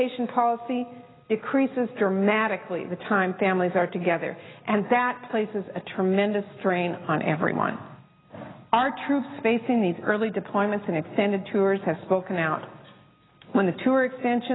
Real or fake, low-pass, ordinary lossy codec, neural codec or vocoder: real; 7.2 kHz; AAC, 16 kbps; none